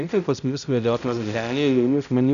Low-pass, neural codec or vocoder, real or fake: 7.2 kHz; codec, 16 kHz, 0.5 kbps, X-Codec, HuBERT features, trained on balanced general audio; fake